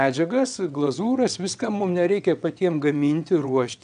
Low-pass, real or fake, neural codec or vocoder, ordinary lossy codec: 9.9 kHz; fake; vocoder, 22.05 kHz, 80 mel bands, WaveNeXt; MP3, 96 kbps